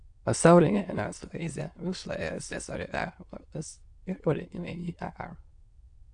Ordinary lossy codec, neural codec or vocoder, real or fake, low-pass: AAC, 64 kbps; autoencoder, 22.05 kHz, a latent of 192 numbers a frame, VITS, trained on many speakers; fake; 9.9 kHz